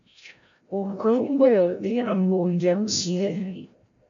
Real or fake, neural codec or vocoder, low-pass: fake; codec, 16 kHz, 0.5 kbps, FreqCodec, larger model; 7.2 kHz